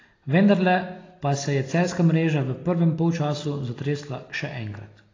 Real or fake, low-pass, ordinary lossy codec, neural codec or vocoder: real; 7.2 kHz; AAC, 32 kbps; none